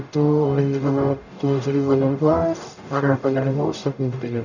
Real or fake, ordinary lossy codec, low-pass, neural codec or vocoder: fake; none; 7.2 kHz; codec, 44.1 kHz, 0.9 kbps, DAC